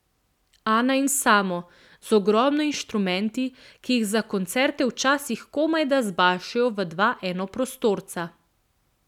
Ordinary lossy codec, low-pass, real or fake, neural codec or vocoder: none; 19.8 kHz; real; none